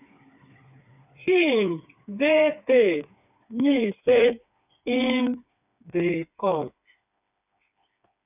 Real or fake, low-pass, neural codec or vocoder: fake; 3.6 kHz; codec, 16 kHz, 4 kbps, FreqCodec, smaller model